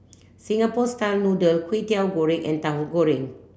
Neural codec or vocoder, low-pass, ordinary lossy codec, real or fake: none; none; none; real